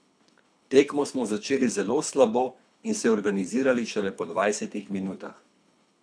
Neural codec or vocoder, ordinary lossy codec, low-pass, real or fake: codec, 24 kHz, 3 kbps, HILCodec; none; 9.9 kHz; fake